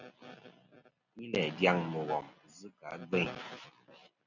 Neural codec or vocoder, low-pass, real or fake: none; 7.2 kHz; real